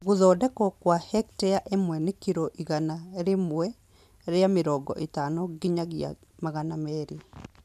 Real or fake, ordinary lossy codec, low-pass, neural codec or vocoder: fake; none; 14.4 kHz; vocoder, 44.1 kHz, 128 mel bands every 512 samples, BigVGAN v2